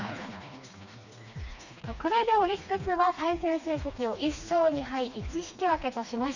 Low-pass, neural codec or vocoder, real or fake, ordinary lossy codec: 7.2 kHz; codec, 16 kHz, 2 kbps, FreqCodec, smaller model; fake; none